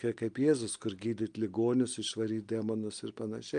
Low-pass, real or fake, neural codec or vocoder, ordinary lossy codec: 9.9 kHz; real; none; Opus, 32 kbps